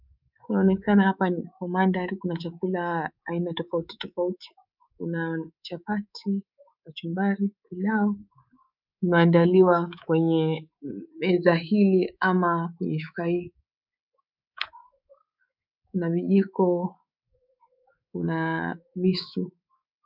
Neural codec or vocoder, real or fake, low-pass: codec, 24 kHz, 3.1 kbps, DualCodec; fake; 5.4 kHz